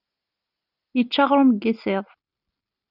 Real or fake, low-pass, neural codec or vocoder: real; 5.4 kHz; none